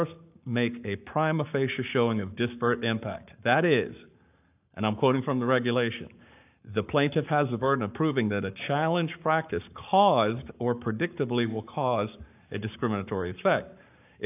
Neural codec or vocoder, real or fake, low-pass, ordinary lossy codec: codec, 44.1 kHz, 7.8 kbps, Pupu-Codec; fake; 3.6 kHz; AAC, 32 kbps